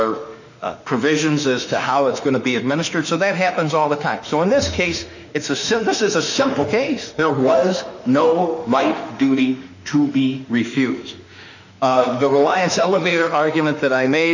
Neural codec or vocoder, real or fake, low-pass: autoencoder, 48 kHz, 32 numbers a frame, DAC-VAE, trained on Japanese speech; fake; 7.2 kHz